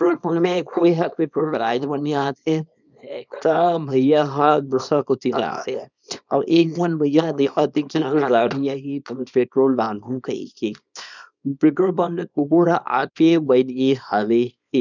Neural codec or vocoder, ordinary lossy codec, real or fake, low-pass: codec, 24 kHz, 0.9 kbps, WavTokenizer, small release; none; fake; 7.2 kHz